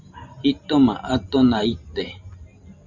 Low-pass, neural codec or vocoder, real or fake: 7.2 kHz; vocoder, 44.1 kHz, 128 mel bands every 512 samples, BigVGAN v2; fake